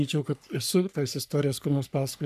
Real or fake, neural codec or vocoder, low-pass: fake; codec, 44.1 kHz, 3.4 kbps, Pupu-Codec; 14.4 kHz